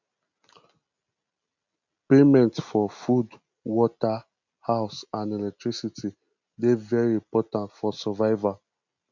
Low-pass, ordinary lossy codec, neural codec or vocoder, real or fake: 7.2 kHz; none; none; real